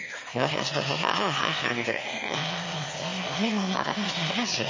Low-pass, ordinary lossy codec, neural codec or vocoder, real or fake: 7.2 kHz; MP3, 32 kbps; autoencoder, 22.05 kHz, a latent of 192 numbers a frame, VITS, trained on one speaker; fake